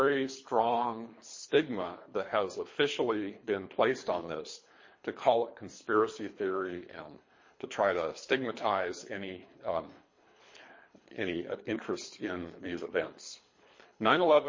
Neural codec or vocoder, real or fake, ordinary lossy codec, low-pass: codec, 24 kHz, 3 kbps, HILCodec; fake; MP3, 32 kbps; 7.2 kHz